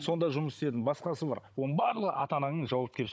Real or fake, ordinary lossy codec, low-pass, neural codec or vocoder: fake; none; none; codec, 16 kHz, 16 kbps, FunCodec, trained on Chinese and English, 50 frames a second